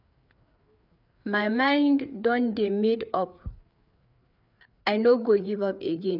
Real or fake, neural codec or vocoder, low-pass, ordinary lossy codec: fake; codec, 16 kHz, 4 kbps, FreqCodec, larger model; 5.4 kHz; none